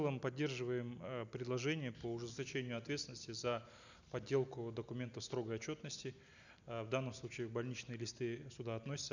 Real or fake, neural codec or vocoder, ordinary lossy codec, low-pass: real; none; none; 7.2 kHz